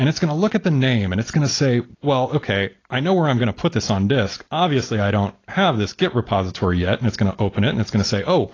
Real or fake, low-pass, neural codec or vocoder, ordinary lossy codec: real; 7.2 kHz; none; AAC, 32 kbps